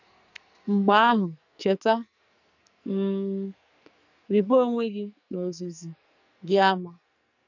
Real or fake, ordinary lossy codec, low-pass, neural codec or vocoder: fake; none; 7.2 kHz; codec, 44.1 kHz, 2.6 kbps, SNAC